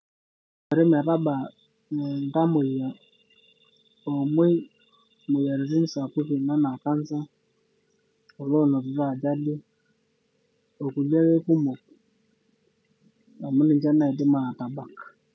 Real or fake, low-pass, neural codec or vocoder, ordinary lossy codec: real; none; none; none